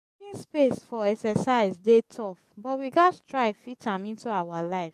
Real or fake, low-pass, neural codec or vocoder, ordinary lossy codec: fake; 14.4 kHz; codec, 44.1 kHz, 7.8 kbps, DAC; MP3, 64 kbps